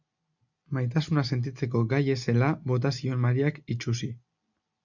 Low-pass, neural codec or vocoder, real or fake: 7.2 kHz; none; real